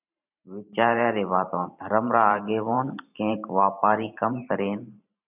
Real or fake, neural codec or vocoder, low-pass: fake; vocoder, 44.1 kHz, 128 mel bands every 512 samples, BigVGAN v2; 3.6 kHz